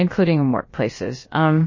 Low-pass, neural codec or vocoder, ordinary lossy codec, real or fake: 7.2 kHz; codec, 16 kHz, about 1 kbps, DyCAST, with the encoder's durations; MP3, 32 kbps; fake